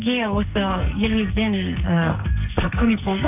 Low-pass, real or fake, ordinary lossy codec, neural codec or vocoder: 3.6 kHz; fake; none; codec, 44.1 kHz, 2.6 kbps, SNAC